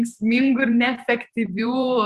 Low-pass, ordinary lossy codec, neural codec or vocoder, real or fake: 14.4 kHz; Opus, 64 kbps; vocoder, 44.1 kHz, 128 mel bands every 512 samples, BigVGAN v2; fake